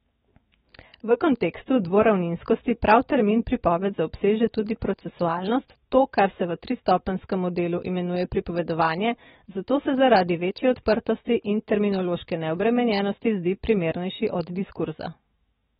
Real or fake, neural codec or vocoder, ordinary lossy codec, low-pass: fake; autoencoder, 48 kHz, 128 numbers a frame, DAC-VAE, trained on Japanese speech; AAC, 16 kbps; 19.8 kHz